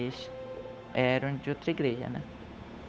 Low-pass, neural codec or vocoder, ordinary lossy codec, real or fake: none; codec, 16 kHz, 8 kbps, FunCodec, trained on Chinese and English, 25 frames a second; none; fake